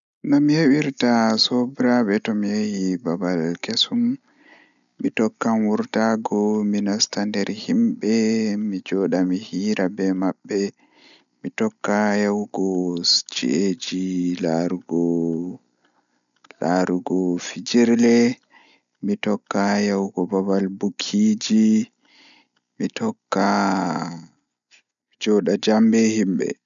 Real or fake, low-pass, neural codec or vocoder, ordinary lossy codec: real; 7.2 kHz; none; none